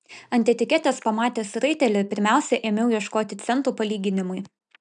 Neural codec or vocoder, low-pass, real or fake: none; 9.9 kHz; real